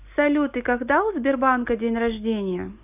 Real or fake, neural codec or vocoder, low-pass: real; none; 3.6 kHz